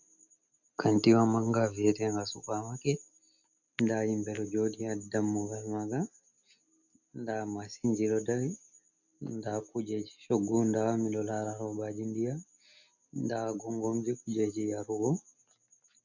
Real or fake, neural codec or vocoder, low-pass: real; none; 7.2 kHz